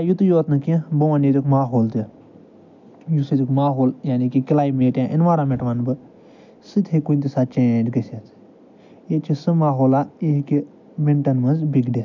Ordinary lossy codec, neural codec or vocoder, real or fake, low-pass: MP3, 64 kbps; none; real; 7.2 kHz